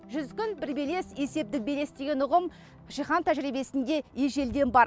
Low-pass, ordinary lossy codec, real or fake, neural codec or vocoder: none; none; real; none